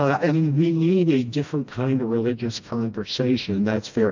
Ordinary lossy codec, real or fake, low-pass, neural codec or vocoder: MP3, 64 kbps; fake; 7.2 kHz; codec, 16 kHz, 1 kbps, FreqCodec, smaller model